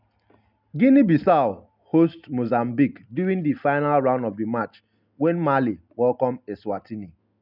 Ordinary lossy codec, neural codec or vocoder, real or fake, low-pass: none; none; real; 5.4 kHz